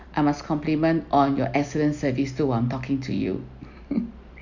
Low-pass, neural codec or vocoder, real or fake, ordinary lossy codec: 7.2 kHz; none; real; none